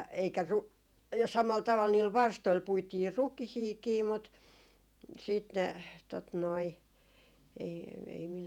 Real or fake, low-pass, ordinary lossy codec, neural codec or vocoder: fake; 19.8 kHz; none; vocoder, 48 kHz, 128 mel bands, Vocos